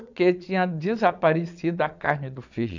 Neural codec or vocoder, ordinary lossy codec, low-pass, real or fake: vocoder, 22.05 kHz, 80 mel bands, Vocos; none; 7.2 kHz; fake